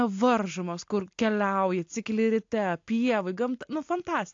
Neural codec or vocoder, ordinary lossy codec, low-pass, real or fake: none; AAC, 48 kbps; 7.2 kHz; real